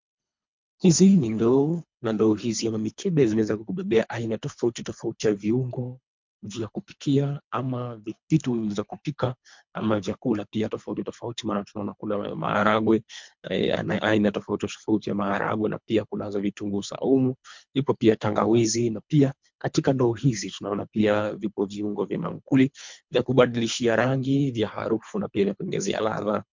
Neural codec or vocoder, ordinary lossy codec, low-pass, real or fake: codec, 24 kHz, 3 kbps, HILCodec; MP3, 64 kbps; 7.2 kHz; fake